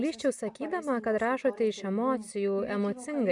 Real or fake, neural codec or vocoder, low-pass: real; none; 10.8 kHz